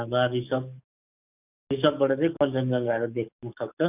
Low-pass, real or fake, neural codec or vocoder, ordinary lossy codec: 3.6 kHz; fake; codec, 44.1 kHz, 7.8 kbps, DAC; none